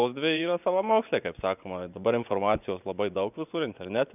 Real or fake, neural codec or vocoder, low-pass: fake; vocoder, 24 kHz, 100 mel bands, Vocos; 3.6 kHz